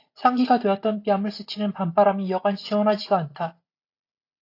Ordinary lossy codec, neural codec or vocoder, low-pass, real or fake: AAC, 32 kbps; none; 5.4 kHz; real